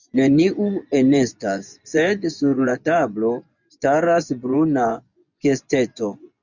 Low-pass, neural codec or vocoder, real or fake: 7.2 kHz; vocoder, 24 kHz, 100 mel bands, Vocos; fake